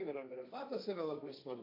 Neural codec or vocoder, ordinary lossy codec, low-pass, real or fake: codec, 16 kHz, 1.1 kbps, Voila-Tokenizer; AAC, 48 kbps; 5.4 kHz; fake